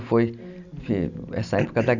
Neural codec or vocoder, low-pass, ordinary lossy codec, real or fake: none; 7.2 kHz; none; real